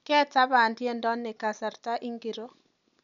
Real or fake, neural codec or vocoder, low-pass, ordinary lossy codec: real; none; 7.2 kHz; none